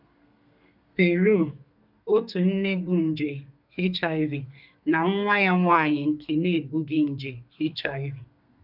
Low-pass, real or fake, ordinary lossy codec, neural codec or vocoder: 5.4 kHz; fake; none; codec, 32 kHz, 1.9 kbps, SNAC